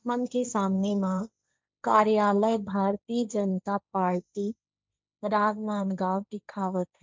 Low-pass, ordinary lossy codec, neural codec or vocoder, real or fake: none; none; codec, 16 kHz, 1.1 kbps, Voila-Tokenizer; fake